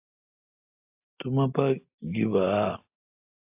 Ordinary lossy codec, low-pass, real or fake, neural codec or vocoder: AAC, 32 kbps; 3.6 kHz; real; none